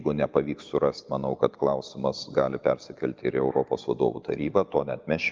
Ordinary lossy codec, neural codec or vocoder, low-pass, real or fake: Opus, 24 kbps; none; 7.2 kHz; real